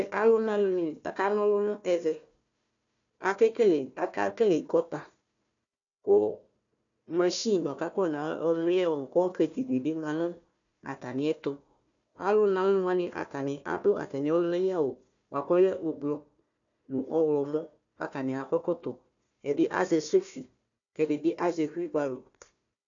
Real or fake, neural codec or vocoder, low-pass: fake; codec, 16 kHz, 1 kbps, FunCodec, trained on Chinese and English, 50 frames a second; 7.2 kHz